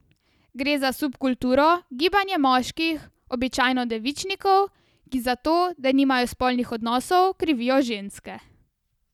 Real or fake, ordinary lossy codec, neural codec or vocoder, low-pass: real; none; none; 19.8 kHz